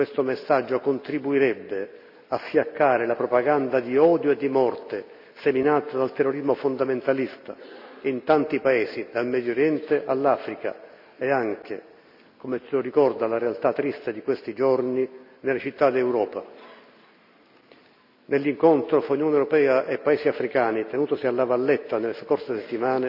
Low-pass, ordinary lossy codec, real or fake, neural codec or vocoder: 5.4 kHz; none; real; none